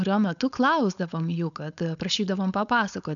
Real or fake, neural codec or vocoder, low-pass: fake; codec, 16 kHz, 4.8 kbps, FACodec; 7.2 kHz